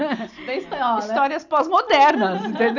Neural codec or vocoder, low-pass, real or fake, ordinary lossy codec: none; 7.2 kHz; real; none